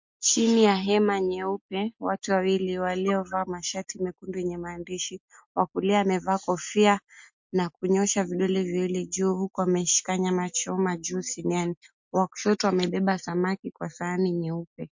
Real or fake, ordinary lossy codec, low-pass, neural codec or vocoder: real; MP3, 48 kbps; 7.2 kHz; none